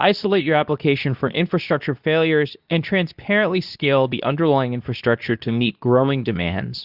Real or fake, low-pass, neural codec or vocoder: fake; 5.4 kHz; codec, 24 kHz, 0.9 kbps, WavTokenizer, medium speech release version 2